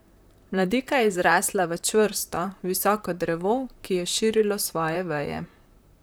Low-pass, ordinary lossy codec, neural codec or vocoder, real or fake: none; none; vocoder, 44.1 kHz, 128 mel bands, Pupu-Vocoder; fake